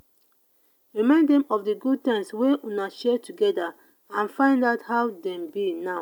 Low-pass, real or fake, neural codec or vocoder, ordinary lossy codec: 19.8 kHz; real; none; none